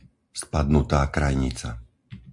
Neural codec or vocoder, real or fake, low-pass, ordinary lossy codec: none; real; 10.8 kHz; MP3, 64 kbps